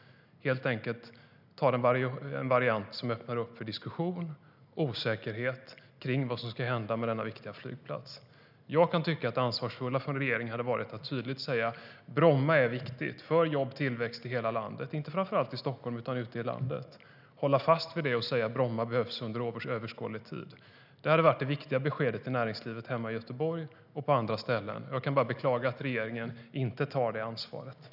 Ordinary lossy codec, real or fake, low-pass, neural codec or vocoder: none; real; 5.4 kHz; none